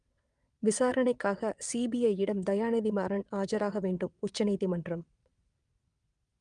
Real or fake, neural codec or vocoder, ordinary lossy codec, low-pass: fake; vocoder, 22.05 kHz, 80 mel bands, WaveNeXt; Opus, 32 kbps; 9.9 kHz